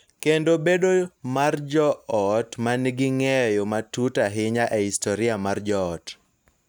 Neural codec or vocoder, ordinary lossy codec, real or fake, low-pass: none; none; real; none